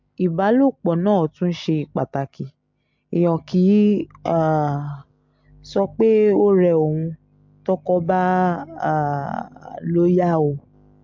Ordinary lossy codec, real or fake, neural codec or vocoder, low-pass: MP3, 48 kbps; real; none; 7.2 kHz